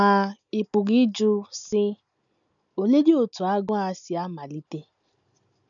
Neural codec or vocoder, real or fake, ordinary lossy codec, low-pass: none; real; none; 7.2 kHz